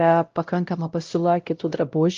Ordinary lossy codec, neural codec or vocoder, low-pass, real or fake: Opus, 32 kbps; codec, 16 kHz, 0.5 kbps, X-Codec, WavLM features, trained on Multilingual LibriSpeech; 7.2 kHz; fake